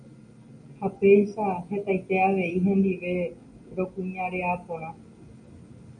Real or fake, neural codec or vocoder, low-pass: real; none; 9.9 kHz